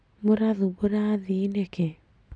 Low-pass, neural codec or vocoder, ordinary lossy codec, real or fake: 9.9 kHz; none; none; real